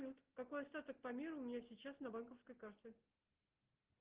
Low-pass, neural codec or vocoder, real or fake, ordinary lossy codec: 3.6 kHz; none; real; Opus, 16 kbps